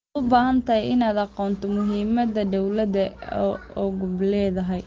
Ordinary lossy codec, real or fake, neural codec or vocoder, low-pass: Opus, 16 kbps; real; none; 7.2 kHz